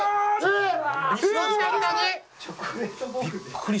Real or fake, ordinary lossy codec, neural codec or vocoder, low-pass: real; none; none; none